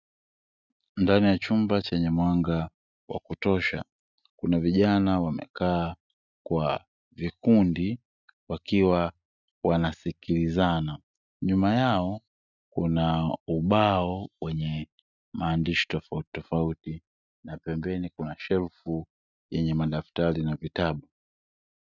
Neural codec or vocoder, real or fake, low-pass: none; real; 7.2 kHz